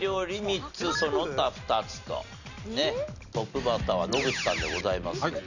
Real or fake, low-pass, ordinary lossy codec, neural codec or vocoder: real; 7.2 kHz; none; none